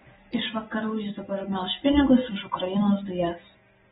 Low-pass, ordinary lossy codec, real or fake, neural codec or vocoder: 7.2 kHz; AAC, 16 kbps; real; none